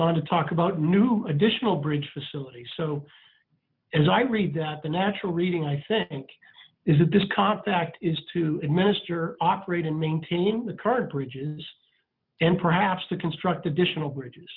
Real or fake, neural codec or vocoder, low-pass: real; none; 5.4 kHz